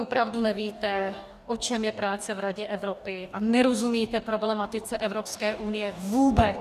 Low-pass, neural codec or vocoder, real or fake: 14.4 kHz; codec, 44.1 kHz, 2.6 kbps, DAC; fake